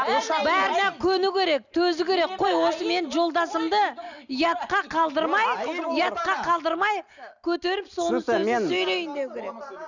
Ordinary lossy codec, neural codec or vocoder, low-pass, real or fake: none; none; 7.2 kHz; real